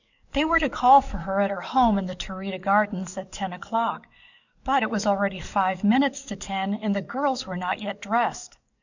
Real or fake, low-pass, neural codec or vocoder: fake; 7.2 kHz; codec, 44.1 kHz, 7.8 kbps, DAC